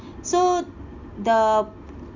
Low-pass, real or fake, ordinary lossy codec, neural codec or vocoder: 7.2 kHz; real; none; none